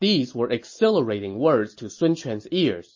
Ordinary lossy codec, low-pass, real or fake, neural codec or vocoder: MP3, 32 kbps; 7.2 kHz; real; none